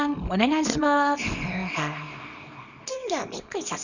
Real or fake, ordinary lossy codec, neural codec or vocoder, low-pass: fake; none; codec, 24 kHz, 0.9 kbps, WavTokenizer, small release; 7.2 kHz